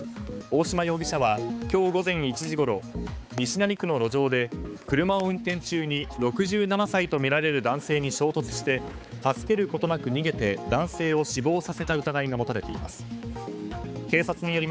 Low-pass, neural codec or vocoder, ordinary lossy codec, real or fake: none; codec, 16 kHz, 4 kbps, X-Codec, HuBERT features, trained on balanced general audio; none; fake